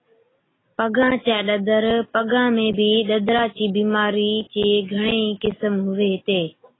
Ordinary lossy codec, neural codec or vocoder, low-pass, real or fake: AAC, 16 kbps; none; 7.2 kHz; real